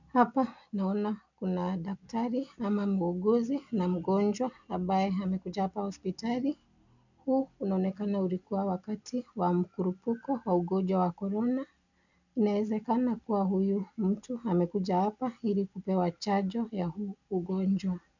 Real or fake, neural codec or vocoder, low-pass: real; none; 7.2 kHz